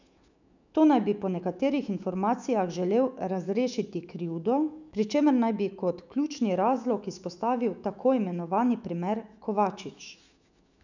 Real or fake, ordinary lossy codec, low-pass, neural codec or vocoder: fake; none; 7.2 kHz; autoencoder, 48 kHz, 128 numbers a frame, DAC-VAE, trained on Japanese speech